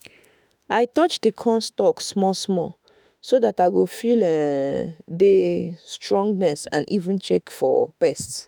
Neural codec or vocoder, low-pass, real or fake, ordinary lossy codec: autoencoder, 48 kHz, 32 numbers a frame, DAC-VAE, trained on Japanese speech; none; fake; none